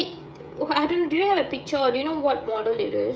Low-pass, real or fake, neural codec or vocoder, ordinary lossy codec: none; fake; codec, 16 kHz, 16 kbps, FreqCodec, smaller model; none